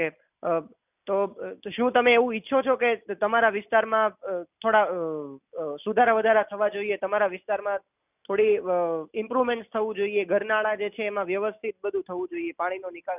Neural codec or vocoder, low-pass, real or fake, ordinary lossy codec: none; 3.6 kHz; real; none